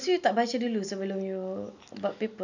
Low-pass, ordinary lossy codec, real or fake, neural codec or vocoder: 7.2 kHz; none; real; none